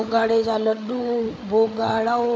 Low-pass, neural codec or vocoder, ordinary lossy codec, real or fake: none; codec, 16 kHz, 8 kbps, FreqCodec, larger model; none; fake